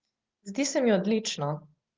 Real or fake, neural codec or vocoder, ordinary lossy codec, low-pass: real; none; Opus, 16 kbps; 7.2 kHz